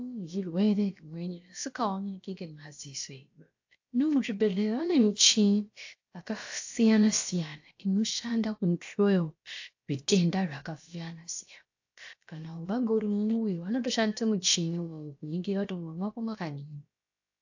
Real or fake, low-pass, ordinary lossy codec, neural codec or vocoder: fake; 7.2 kHz; AAC, 48 kbps; codec, 16 kHz, about 1 kbps, DyCAST, with the encoder's durations